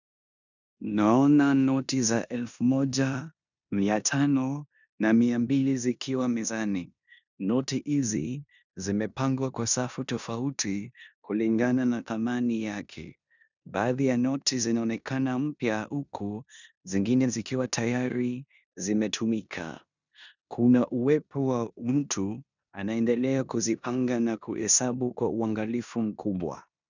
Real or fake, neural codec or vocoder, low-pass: fake; codec, 16 kHz in and 24 kHz out, 0.9 kbps, LongCat-Audio-Codec, four codebook decoder; 7.2 kHz